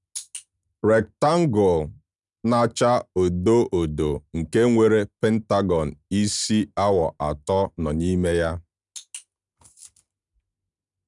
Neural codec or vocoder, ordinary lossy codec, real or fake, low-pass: none; none; real; 10.8 kHz